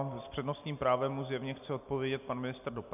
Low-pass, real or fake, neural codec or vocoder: 3.6 kHz; real; none